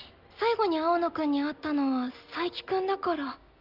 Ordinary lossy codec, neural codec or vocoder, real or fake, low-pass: Opus, 24 kbps; none; real; 5.4 kHz